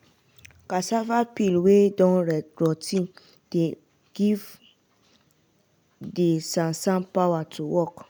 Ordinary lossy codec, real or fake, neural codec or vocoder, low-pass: none; real; none; none